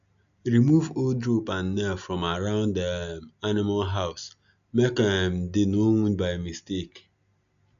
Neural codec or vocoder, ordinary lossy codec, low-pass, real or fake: none; none; 7.2 kHz; real